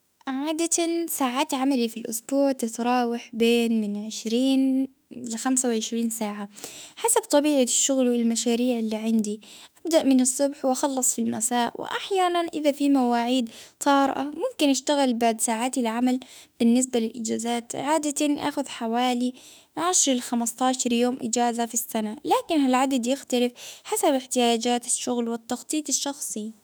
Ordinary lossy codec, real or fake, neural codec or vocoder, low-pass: none; fake; autoencoder, 48 kHz, 32 numbers a frame, DAC-VAE, trained on Japanese speech; none